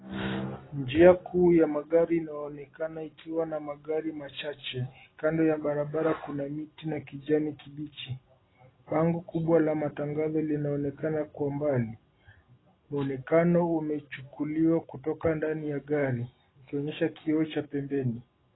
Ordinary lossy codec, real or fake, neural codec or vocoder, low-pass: AAC, 16 kbps; real; none; 7.2 kHz